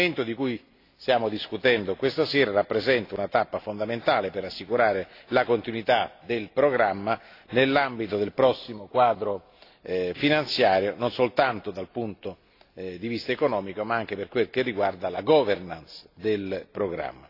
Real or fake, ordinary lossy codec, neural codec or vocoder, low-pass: real; AAC, 32 kbps; none; 5.4 kHz